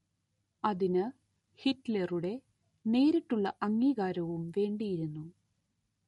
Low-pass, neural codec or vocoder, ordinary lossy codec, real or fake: 19.8 kHz; none; MP3, 48 kbps; real